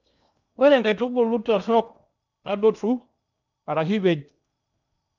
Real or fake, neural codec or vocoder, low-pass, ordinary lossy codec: fake; codec, 16 kHz in and 24 kHz out, 0.8 kbps, FocalCodec, streaming, 65536 codes; 7.2 kHz; none